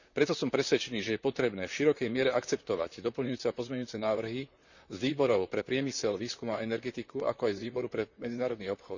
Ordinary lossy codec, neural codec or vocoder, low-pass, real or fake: none; vocoder, 44.1 kHz, 128 mel bands, Pupu-Vocoder; 7.2 kHz; fake